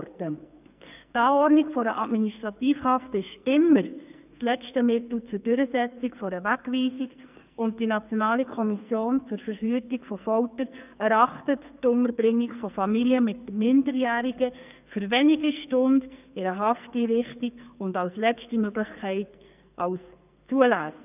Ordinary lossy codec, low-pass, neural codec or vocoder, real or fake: none; 3.6 kHz; codec, 44.1 kHz, 2.6 kbps, SNAC; fake